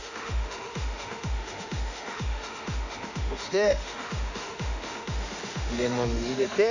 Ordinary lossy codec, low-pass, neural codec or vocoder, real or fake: none; 7.2 kHz; autoencoder, 48 kHz, 32 numbers a frame, DAC-VAE, trained on Japanese speech; fake